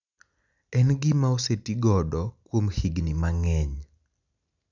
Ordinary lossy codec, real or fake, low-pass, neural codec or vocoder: none; real; 7.2 kHz; none